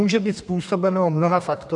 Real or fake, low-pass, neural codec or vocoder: fake; 10.8 kHz; codec, 32 kHz, 1.9 kbps, SNAC